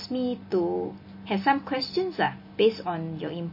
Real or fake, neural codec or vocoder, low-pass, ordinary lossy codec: real; none; 5.4 kHz; MP3, 24 kbps